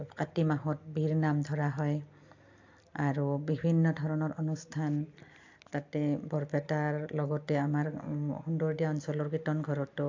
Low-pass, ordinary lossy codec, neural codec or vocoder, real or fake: 7.2 kHz; AAC, 48 kbps; none; real